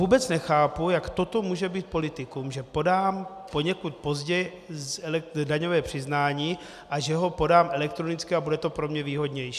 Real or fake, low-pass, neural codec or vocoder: real; 14.4 kHz; none